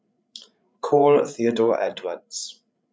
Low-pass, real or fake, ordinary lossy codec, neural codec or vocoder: none; fake; none; codec, 16 kHz, 16 kbps, FreqCodec, larger model